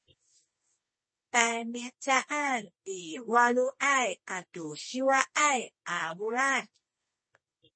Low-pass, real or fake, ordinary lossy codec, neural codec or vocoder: 9.9 kHz; fake; MP3, 32 kbps; codec, 24 kHz, 0.9 kbps, WavTokenizer, medium music audio release